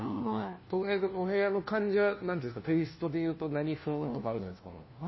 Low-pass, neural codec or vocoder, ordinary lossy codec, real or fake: 7.2 kHz; codec, 16 kHz, 1 kbps, FunCodec, trained on LibriTTS, 50 frames a second; MP3, 24 kbps; fake